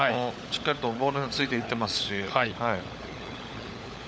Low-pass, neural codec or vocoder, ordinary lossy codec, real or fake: none; codec, 16 kHz, 16 kbps, FunCodec, trained on LibriTTS, 50 frames a second; none; fake